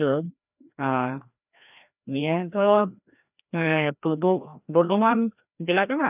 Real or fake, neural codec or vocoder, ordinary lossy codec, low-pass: fake; codec, 16 kHz, 1 kbps, FreqCodec, larger model; none; 3.6 kHz